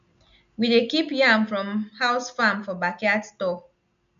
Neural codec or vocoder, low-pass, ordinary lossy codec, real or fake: none; 7.2 kHz; none; real